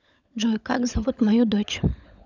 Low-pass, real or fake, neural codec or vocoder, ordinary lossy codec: 7.2 kHz; fake; codec, 16 kHz, 16 kbps, FunCodec, trained on LibriTTS, 50 frames a second; none